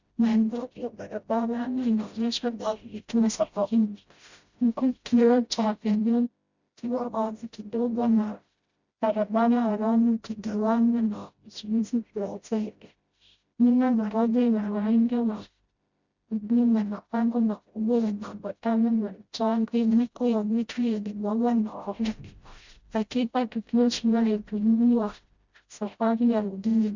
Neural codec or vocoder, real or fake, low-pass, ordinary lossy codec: codec, 16 kHz, 0.5 kbps, FreqCodec, smaller model; fake; 7.2 kHz; Opus, 64 kbps